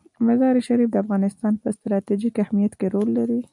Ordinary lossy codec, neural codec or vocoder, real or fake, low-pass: MP3, 96 kbps; none; real; 10.8 kHz